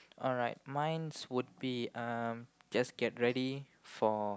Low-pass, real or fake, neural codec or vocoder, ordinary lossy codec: none; real; none; none